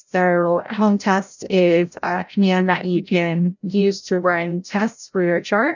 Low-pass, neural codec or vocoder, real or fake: 7.2 kHz; codec, 16 kHz, 0.5 kbps, FreqCodec, larger model; fake